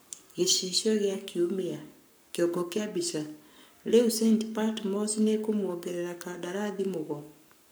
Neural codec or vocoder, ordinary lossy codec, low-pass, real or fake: codec, 44.1 kHz, 7.8 kbps, Pupu-Codec; none; none; fake